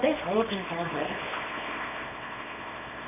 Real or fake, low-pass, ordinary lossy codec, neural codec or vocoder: fake; 3.6 kHz; none; codec, 24 kHz, 0.9 kbps, WavTokenizer, small release